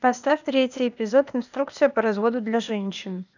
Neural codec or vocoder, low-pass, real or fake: codec, 16 kHz, 0.8 kbps, ZipCodec; 7.2 kHz; fake